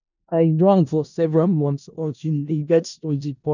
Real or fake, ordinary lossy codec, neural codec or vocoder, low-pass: fake; none; codec, 16 kHz in and 24 kHz out, 0.4 kbps, LongCat-Audio-Codec, four codebook decoder; 7.2 kHz